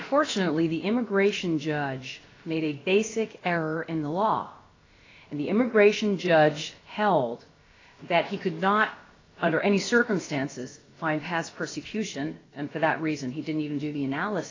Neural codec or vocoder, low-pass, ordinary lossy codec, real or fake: codec, 16 kHz, about 1 kbps, DyCAST, with the encoder's durations; 7.2 kHz; AAC, 32 kbps; fake